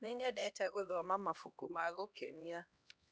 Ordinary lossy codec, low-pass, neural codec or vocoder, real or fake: none; none; codec, 16 kHz, 1 kbps, X-Codec, HuBERT features, trained on LibriSpeech; fake